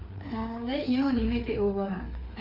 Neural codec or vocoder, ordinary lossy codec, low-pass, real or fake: codec, 16 kHz, 4 kbps, FreqCodec, larger model; none; 5.4 kHz; fake